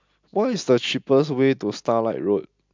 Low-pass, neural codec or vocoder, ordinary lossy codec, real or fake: 7.2 kHz; none; MP3, 64 kbps; real